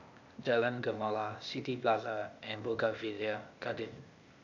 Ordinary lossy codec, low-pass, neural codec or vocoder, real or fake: none; 7.2 kHz; codec, 16 kHz, 0.8 kbps, ZipCodec; fake